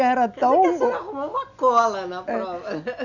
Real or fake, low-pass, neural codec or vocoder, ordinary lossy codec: real; 7.2 kHz; none; none